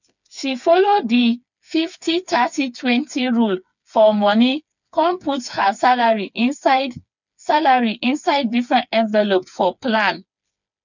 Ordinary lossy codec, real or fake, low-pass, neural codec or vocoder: none; fake; 7.2 kHz; codec, 16 kHz, 4 kbps, FreqCodec, smaller model